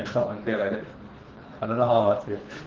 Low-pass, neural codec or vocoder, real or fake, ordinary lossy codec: 7.2 kHz; codec, 24 kHz, 3 kbps, HILCodec; fake; Opus, 16 kbps